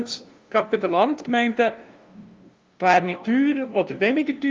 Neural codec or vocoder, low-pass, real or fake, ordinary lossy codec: codec, 16 kHz, 0.5 kbps, FunCodec, trained on LibriTTS, 25 frames a second; 7.2 kHz; fake; Opus, 32 kbps